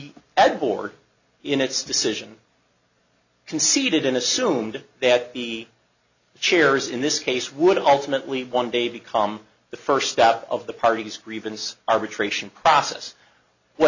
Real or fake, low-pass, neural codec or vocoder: real; 7.2 kHz; none